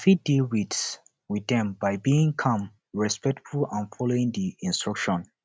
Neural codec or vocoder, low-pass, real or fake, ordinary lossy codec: none; none; real; none